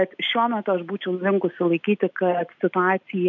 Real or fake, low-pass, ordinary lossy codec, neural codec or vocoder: real; 7.2 kHz; MP3, 64 kbps; none